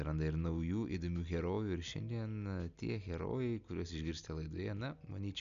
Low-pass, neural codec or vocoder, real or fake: 7.2 kHz; none; real